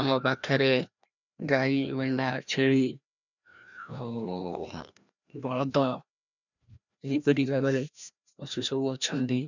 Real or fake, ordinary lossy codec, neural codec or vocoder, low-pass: fake; none; codec, 16 kHz, 1 kbps, FreqCodec, larger model; 7.2 kHz